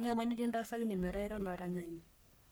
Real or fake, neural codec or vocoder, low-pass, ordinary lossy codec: fake; codec, 44.1 kHz, 1.7 kbps, Pupu-Codec; none; none